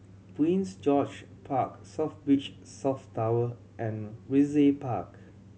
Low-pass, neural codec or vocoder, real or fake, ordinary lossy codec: none; none; real; none